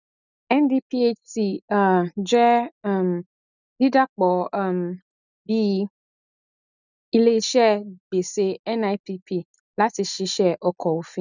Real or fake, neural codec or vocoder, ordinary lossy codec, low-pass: real; none; none; 7.2 kHz